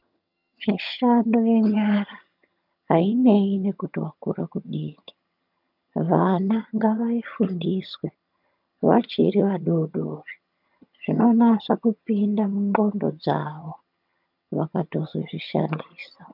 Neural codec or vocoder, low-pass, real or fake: vocoder, 22.05 kHz, 80 mel bands, HiFi-GAN; 5.4 kHz; fake